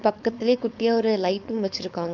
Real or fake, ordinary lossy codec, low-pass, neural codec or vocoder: fake; none; 7.2 kHz; codec, 16 kHz, 4.8 kbps, FACodec